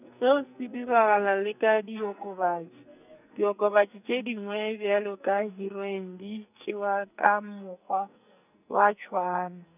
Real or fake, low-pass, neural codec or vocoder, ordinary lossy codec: fake; 3.6 kHz; codec, 44.1 kHz, 2.6 kbps, SNAC; none